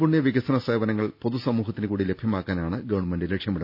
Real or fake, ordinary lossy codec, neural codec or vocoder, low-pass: real; none; none; 5.4 kHz